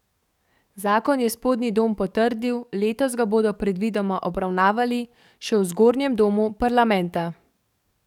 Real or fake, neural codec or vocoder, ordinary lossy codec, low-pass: fake; codec, 44.1 kHz, 7.8 kbps, DAC; none; 19.8 kHz